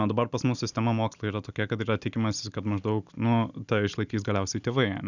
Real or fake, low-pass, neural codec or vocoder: real; 7.2 kHz; none